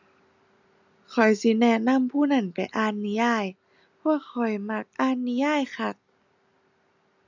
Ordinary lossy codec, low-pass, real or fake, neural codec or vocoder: none; 7.2 kHz; real; none